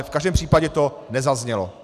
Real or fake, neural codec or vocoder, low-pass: real; none; 14.4 kHz